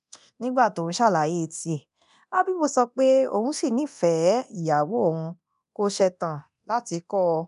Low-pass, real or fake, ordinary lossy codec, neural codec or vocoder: 10.8 kHz; fake; none; codec, 24 kHz, 0.9 kbps, DualCodec